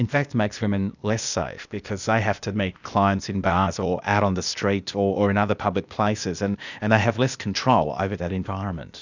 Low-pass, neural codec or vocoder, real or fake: 7.2 kHz; codec, 16 kHz, 0.8 kbps, ZipCodec; fake